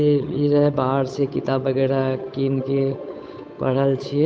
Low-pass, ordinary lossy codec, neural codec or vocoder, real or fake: none; none; codec, 16 kHz, 8 kbps, FunCodec, trained on Chinese and English, 25 frames a second; fake